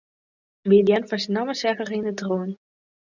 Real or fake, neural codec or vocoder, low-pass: real; none; 7.2 kHz